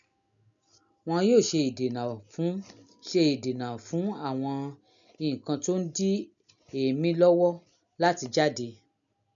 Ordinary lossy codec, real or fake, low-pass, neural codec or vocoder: none; real; 7.2 kHz; none